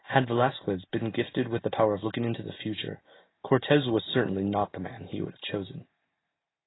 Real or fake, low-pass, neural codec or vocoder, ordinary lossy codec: real; 7.2 kHz; none; AAC, 16 kbps